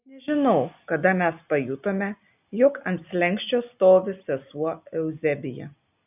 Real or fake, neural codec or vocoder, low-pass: real; none; 3.6 kHz